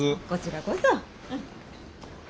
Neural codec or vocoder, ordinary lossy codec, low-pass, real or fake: none; none; none; real